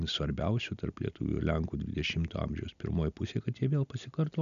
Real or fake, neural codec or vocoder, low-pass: real; none; 7.2 kHz